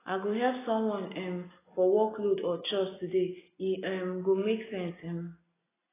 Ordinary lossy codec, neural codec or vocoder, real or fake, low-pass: AAC, 16 kbps; none; real; 3.6 kHz